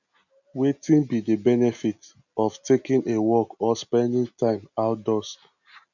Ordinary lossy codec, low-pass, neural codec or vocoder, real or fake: none; 7.2 kHz; none; real